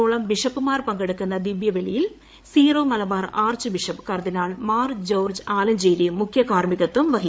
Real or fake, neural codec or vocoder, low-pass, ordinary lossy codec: fake; codec, 16 kHz, 8 kbps, FreqCodec, larger model; none; none